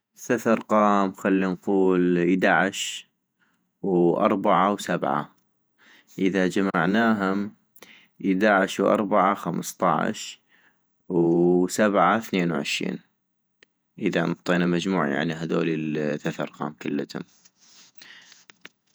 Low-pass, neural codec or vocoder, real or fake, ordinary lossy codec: none; vocoder, 48 kHz, 128 mel bands, Vocos; fake; none